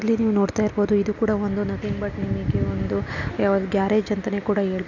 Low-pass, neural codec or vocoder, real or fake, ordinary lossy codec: 7.2 kHz; none; real; none